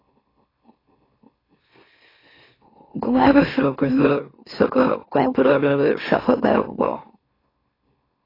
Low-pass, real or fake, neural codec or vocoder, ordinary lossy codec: 5.4 kHz; fake; autoencoder, 44.1 kHz, a latent of 192 numbers a frame, MeloTTS; AAC, 24 kbps